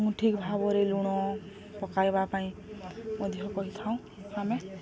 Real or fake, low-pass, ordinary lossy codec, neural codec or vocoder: real; none; none; none